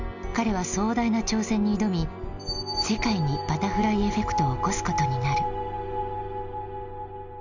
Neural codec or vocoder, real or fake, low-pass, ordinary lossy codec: none; real; 7.2 kHz; none